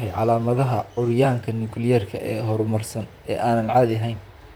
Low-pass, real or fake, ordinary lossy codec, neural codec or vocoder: none; fake; none; vocoder, 44.1 kHz, 128 mel bands, Pupu-Vocoder